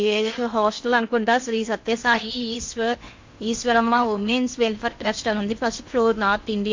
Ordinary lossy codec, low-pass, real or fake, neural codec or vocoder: MP3, 48 kbps; 7.2 kHz; fake; codec, 16 kHz in and 24 kHz out, 0.8 kbps, FocalCodec, streaming, 65536 codes